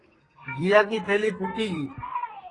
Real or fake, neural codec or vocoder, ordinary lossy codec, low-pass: fake; codec, 32 kHz, 1.9 kbps, SNAC; AAC, 32 kbps; 10.8 kHz